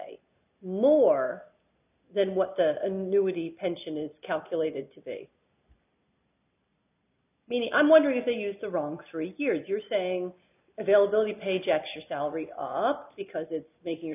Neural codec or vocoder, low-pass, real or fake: none; 3.6 kHz; real